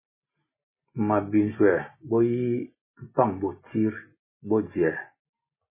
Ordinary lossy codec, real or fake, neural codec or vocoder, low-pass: MP3, 16 kbps; real; none; 3.6 kHz